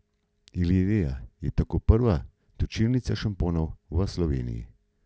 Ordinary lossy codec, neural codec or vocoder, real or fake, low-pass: none; none; real; none